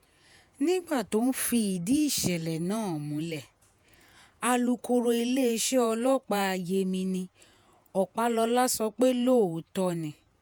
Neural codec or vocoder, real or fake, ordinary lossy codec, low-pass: vocoder, 48 kHz, 128 mel bands, Vocos; fake; none; none